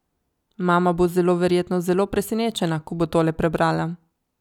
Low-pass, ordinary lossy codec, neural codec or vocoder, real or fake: 19.8 kHz; none; none; real